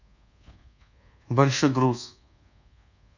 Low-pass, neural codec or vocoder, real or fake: 7.2 kHz; codec, 24 kHz, 1.2 kbps, DualCodec; fake